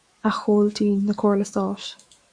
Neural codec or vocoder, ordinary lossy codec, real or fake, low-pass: autoencoder, 48 kHz, 128 numbers a frame, DAC-VAE, trained on Japanese speech; Opus, 64 kbps; fake; 9.9 kHz